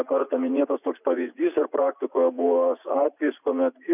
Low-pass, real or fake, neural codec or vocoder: 3.6 kHz; fake; vocoder, 44.1 kHz, 80 mel bands, Vocos